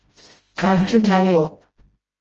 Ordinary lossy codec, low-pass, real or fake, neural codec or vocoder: Opus, 24 kbps; 7.2 kHz; fake; codec, 16 kHz, 0.5 kbps, FreqCodec, smaller model